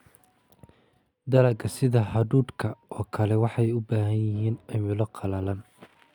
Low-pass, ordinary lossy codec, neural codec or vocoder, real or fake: 19.8 kHz; none; none; real